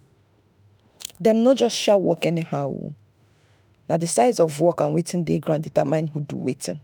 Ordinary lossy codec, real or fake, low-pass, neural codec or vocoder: none; fake; none; autoencoder, 48 kHz, 32 numbers a frame, DAC-VAE, trained on Japanese speech